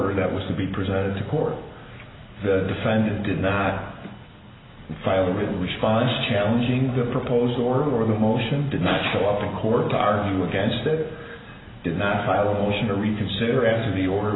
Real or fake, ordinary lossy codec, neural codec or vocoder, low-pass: real; AAC, 16 kbps; none; 7.2 kHz